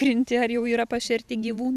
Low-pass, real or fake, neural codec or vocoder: 14.4 kHz; fake; vocoder, 44.1 kHz, 128 mel bands every 512 samples, BigVGAN v2